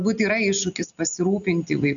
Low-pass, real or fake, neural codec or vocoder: 7.2 kHz; real; none